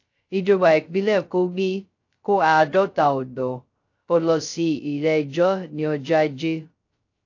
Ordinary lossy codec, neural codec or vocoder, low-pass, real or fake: AAC, 48 kbps; codec, 16 kHz, 0.2 kbps, FocalCodec; 7.2 kHz; fake